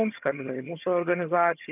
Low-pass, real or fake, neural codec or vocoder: 3.6 kHz; fake; vocoder, 22.05 kHz, 80 mel bands, HiFi-GAN